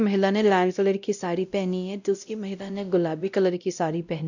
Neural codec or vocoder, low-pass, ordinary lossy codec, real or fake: codec, 16 kHz, 0.5 kbps, X-Codec, WavLM features, trained on Multilingual LibriSpeech; 7.2 kHz; none; fake